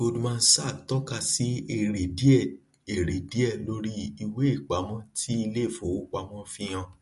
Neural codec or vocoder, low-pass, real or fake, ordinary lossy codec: none; 14.4 kHz; real; MP3, 48 kbps